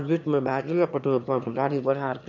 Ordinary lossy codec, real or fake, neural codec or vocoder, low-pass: none; fake; autoencoder, 22.05 kHz, a latent of 192 numbers a frame, VITS, trained on one speaker; 7.2 kHz